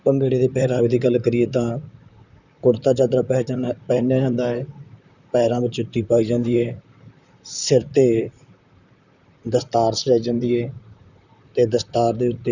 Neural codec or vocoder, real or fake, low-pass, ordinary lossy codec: vocoder, 44.1 kHz, 128 mel bands, Pupu-Vocoder; fake; 7.2 kHz; none